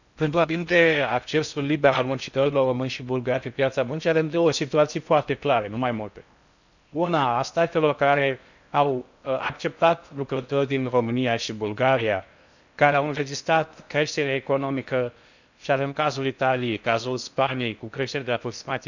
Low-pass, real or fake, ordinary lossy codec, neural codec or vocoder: 7.2 kHz; fake; none; codec, 16 kHz in and 24 kHz out, 0.6 kbps, FocalCodec, streaming, 4096 codes